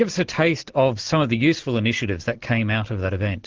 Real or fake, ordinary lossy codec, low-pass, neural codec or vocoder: real; Opus, 16 kbps; 7.2 kHz; none